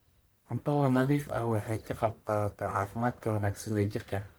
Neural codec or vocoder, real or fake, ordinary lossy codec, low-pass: codec, 44.1 kHz, 1.7 kbps, Pupu-Codec; fake; none; none